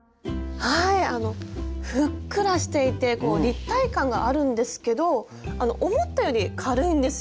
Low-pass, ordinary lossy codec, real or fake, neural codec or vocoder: none; none; real; none